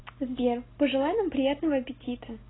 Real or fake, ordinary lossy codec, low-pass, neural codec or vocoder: real; AAC, 16 kbps; 7.2 kHz; none